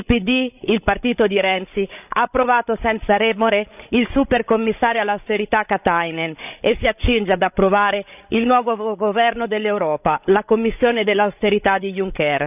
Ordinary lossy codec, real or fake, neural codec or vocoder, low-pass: none; fake; codec, 16 kHz, 16 kbps, FreqCodec, larger model; 3.6 kHz